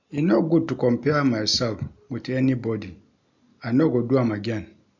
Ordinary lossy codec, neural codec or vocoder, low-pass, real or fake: none; none; 7.2 kHz; real